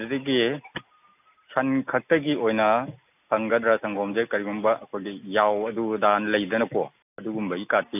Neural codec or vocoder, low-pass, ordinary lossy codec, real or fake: none; 3.6 kHz; none; real